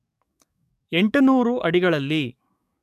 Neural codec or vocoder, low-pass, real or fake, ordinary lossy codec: codec, 44.1 kHz, 7.8 kbps, DAC; 14.4 kHz; fake; none